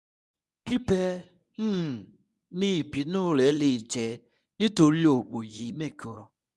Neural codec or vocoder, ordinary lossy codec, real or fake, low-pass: codec, 24 kHz, 0.9 kbps, WavTokenizer, medium speech release version 1; none; fake; none